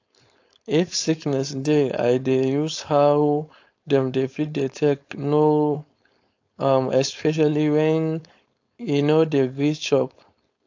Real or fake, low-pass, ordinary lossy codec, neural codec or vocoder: fake; 7.2 kHz; AAC, 48 kbps; codec, 16 kHz, 4.8 kbps, FACodec